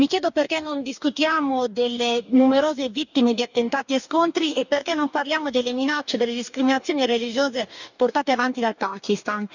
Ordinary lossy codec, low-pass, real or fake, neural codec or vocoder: none; 7.2 kHz; fake; codec, 44.1 kHz, 2.6 kbps, DAC